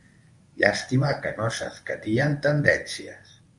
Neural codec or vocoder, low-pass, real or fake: codec, 24 kHz, 0.9 kbps, WavTokenizer, medium speech release version 1; 10.8 kHz; fake